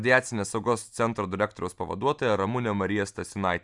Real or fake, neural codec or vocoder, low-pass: real; none; 10.8 kHz